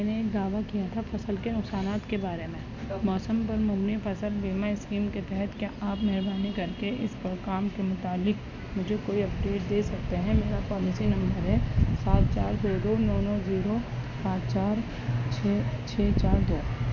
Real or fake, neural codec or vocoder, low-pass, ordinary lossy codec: real; none; 7.2 kHz; none